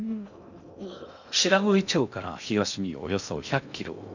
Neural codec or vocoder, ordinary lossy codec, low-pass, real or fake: codec, 16 kHz in and 24 kHz out, 0.6 kbps, FocalCodec, streaming, 2048 codes; none; 7.2 kHz; fake